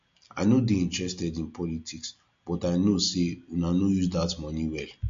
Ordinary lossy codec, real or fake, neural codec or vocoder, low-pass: MP3, 48 kbps; real; none; 7.2 kHz